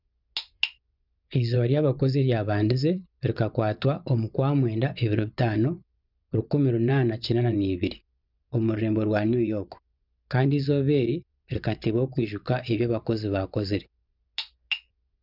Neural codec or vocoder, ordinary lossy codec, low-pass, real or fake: vocoder, 24 kHz, 100 mel bands, Vocos; none; 5.4 kHz; fake